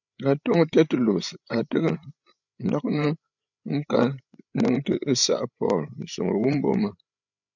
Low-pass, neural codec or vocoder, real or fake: 7.2 kHz; codec, 16 kHz, 8 kbps, FreqCodec, larger model; fake